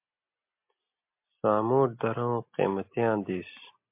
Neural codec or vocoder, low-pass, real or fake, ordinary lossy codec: none; 3.6 kHz; real; MP3, 32 kbps